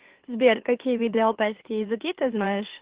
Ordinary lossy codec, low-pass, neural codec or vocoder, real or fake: Opus, 16 kbps; 3.6 kHz; autoencoder, 44.1 kHz, a latent of 192 numbers a frame, MeloTTS; fake